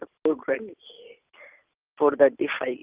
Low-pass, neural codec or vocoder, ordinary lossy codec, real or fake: 3.6 kHz; none; Opus, 32 kbps; real